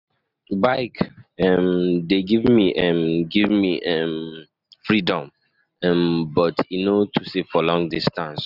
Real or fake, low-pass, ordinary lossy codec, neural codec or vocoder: real; 5.4 kHz; none; none